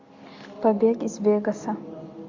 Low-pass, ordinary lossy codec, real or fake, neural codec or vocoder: 7.2 kHz; AAC, 48 kbps; real; none